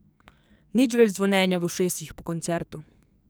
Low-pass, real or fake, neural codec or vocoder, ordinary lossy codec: none; fake; codec, 44.1 kHz, 2.6 kbps, SNAC; none